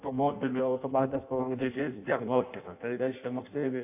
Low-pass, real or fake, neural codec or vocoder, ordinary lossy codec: 3.6 kHz; fake; codec, 16 kHz in and 24 kHz out, 0.6 kbps, FireRedTTS-2 codec; AAC, 32 kbps